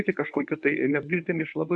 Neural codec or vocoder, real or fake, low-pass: codec, 24 kHz, 0.9 kbps, WavTokenizer, medium speech release version 1; fake; 10.8 kHz